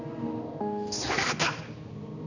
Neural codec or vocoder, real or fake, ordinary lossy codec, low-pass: codec, 16 kHz, 2 kbps, X-Codec, HuBERT features, trained on balanced general audio; fake; none; 7.2 kHz